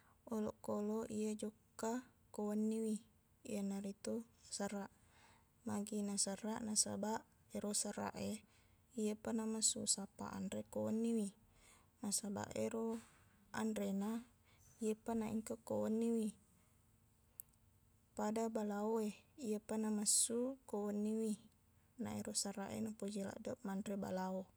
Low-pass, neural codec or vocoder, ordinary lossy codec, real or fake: none; none; none; real